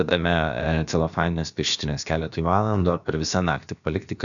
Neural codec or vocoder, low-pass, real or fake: codec, 16 kHz, about 1 kbps, DyCAST, with the encoder's durations; 7.2 kHz; fake